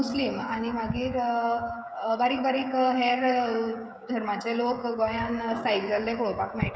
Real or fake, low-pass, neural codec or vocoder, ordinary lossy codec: fake; none; codec, 16 kHz, 16 kbps, FreqCodec, smaller model; none